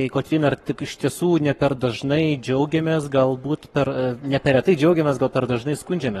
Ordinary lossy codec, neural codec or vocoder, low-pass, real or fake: AAC, 32 kbps; codec, 44.1 kHz, 7.8 kbps, Pupu-Codec; 19.8 kHz; fake